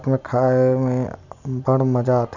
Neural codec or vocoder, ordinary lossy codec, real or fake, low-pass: none; none; real; 7.2 kHz